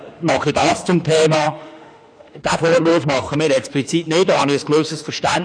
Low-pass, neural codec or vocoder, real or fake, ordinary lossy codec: 9.9 kHz; autoencoder, 48 kHz, 32 numbers a frame, DAC-VAE, trained on Japanese speech; fake; none